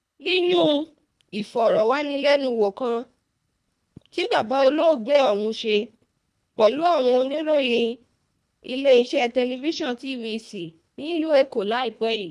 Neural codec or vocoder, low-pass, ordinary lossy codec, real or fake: codec, 24 kHz, 1.5 kbps, HILCodec; none; none; fake